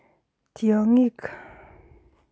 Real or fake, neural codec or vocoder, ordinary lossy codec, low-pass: real; none; none; none